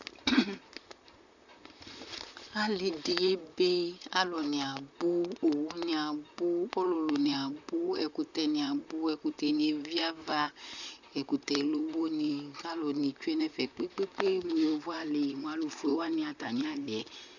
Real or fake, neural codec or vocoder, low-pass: fake; vocoder, 44.1 kHz, 128 mel bands, Pupu-Vocoder; 7.2 kHz